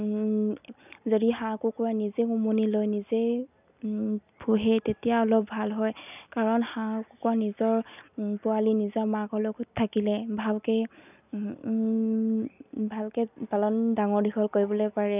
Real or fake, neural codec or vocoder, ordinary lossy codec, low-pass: real; none; none; 3.6 kHz